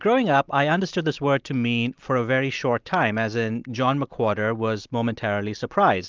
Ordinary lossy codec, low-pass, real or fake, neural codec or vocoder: Opus, 32 kbps; 7.2 kHz; real; none